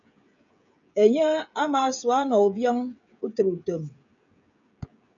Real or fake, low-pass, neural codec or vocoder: fake; 7.2 kHz; codec, 16 kHz, 16 kbps, FreqCodec, smaller model